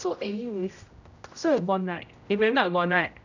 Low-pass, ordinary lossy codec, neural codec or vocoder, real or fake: 7.2 kHz; none; codec, 16 kHz, 0.5 kbps, X-Codec, HuBERT features, trained on general audio; fake